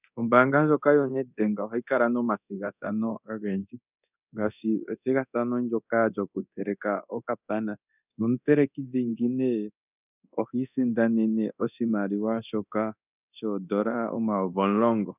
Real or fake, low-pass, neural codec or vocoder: fake; 3.6 kHz; codec, 24 kHz, 0.9 kbps, DualCodec